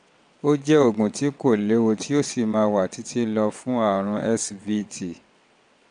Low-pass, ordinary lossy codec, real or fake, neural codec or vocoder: 9.9 kHz; none; fake; vocoder, 22.05 kHz, 80 mel bands, WaveNeXt